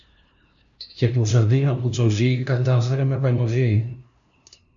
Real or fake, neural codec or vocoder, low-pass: fake; codec, 16 kHz, 0.5 kbps, FunCodec, trained on LibriTTS, 25 frames a second; 7.2 kHz